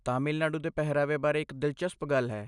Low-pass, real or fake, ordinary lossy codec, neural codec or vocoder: 10.8 kHz; real; none; none